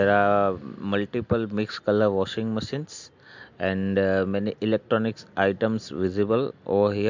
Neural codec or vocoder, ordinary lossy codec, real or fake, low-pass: none; MP3, 64 kbps; real; 7.2 kHz